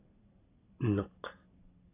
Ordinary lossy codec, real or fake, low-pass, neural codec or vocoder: AAC, 16 kbps; real; 3.6 kHz; none